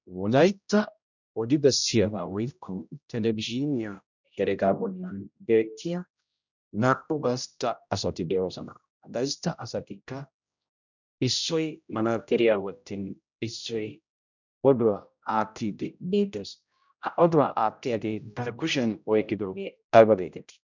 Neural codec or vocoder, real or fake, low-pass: codec, 16 kHz, 0.5 kbps, X-Codec, HuBERT features, trained on general audio; fake; 7.2 kHz